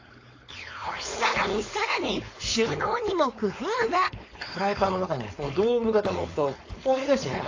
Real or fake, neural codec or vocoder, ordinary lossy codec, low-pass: fake; codec, 16 kHz, 4.8 kbps, FACodec; AAC, 32 kbps; 7.2 kHz